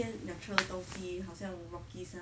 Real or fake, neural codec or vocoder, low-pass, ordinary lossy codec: real; none; none; none